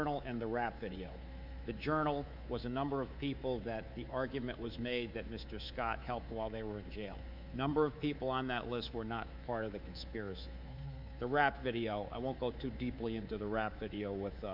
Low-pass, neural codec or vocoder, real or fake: 5.4 kHz; codec, 24 kHz, 3.1 kbps, DualCodec; fake